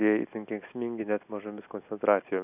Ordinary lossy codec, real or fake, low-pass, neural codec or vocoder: AAC, 32 kbps; real; 3.6 kHz; none